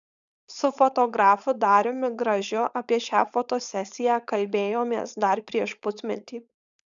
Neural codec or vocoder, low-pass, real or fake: codec, 16 kHz, 4.8 kbps, FACodec; 7.2 kHz; fake